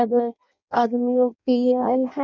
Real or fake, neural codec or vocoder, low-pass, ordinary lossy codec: fake; codec, 16 kHz in and 24 kHz out, 0.6 kbps, FireRedTTS-2 codec; 7.2 kHz; none